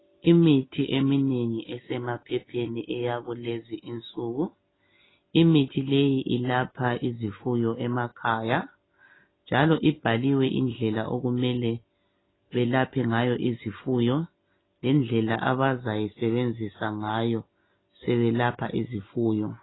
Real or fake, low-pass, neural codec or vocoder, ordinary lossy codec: real; 7.2 kHz; none; AAC, 16 kbps